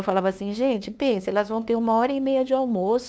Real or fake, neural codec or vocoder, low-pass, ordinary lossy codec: fake; codec, 16 kHz, 2 kbps, FunCodec, trained on LibriTTS, 25 frames a second; none; none